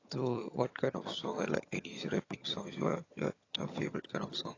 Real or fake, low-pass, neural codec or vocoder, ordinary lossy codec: fake; 7.2 kHz; vocoder, 22.05 kHz, 80 mel bands, HiFi-GAN; AAC, 32 kbps